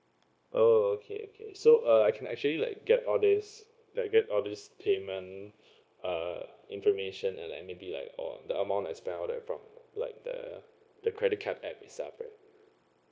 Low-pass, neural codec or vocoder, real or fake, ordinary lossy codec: none; codec, 16 kHz, 0.9 kbps, LongCat-Audio-Codec; fake; none